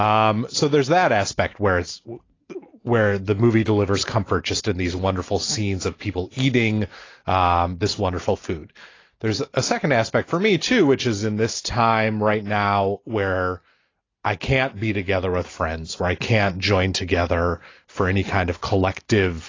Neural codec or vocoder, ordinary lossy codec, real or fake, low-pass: none; AAC, 32 kbps; real; 7.2 kHz